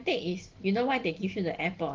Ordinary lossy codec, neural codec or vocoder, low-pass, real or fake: Opus, 16 kbps; none; 7.2 kHz; real